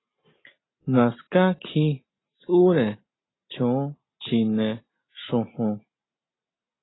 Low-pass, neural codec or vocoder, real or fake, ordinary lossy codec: 7.2 kHz; none; real; AAC, 16 kbps